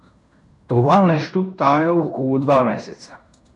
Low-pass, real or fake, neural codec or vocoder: 10.8 kHz; fake; codec, 16 kHz in and 24 kHz out, 0.9 kbps, LongCat-Audio-Codec, fine tuned four codebook decoder